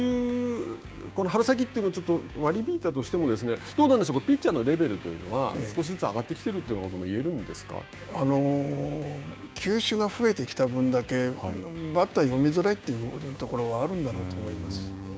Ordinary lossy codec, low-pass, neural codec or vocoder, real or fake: none; none; codec, 16 kHz, 6 kbps, DAC; fake